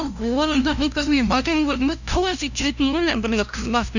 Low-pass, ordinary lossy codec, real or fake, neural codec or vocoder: 7.2 kHz; none; fake; codec, 16 kHz, 0.5 kbps, FunCodec, trained on LibriTTS, 25 frames a second